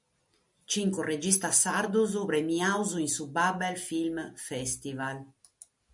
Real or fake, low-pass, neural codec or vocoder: real; 10.8 kHz; none